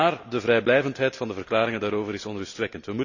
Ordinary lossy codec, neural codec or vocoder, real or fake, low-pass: none; none; real; 7.2 kHz